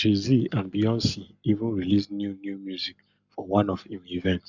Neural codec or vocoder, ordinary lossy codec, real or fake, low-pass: codec, 44.1 kHz, 7.8 kbps, Pupu-Codec; none; fake; 7.2 kHz